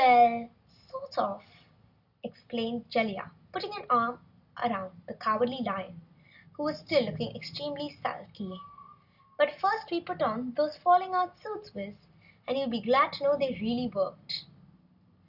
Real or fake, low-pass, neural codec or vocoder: real; 5.4 kHz; none